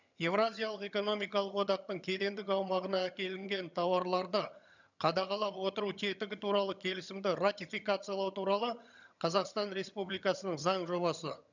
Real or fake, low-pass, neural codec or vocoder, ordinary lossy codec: fake; 7.2 kHz; vocoder, 22.05 kHz, 80 mel bands, HiFi-GAN; none